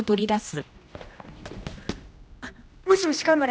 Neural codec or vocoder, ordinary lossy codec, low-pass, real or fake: codec, 16 kHz, 1 kbps, X-Codec, HuBERT features, trained on general audio; none; none; fake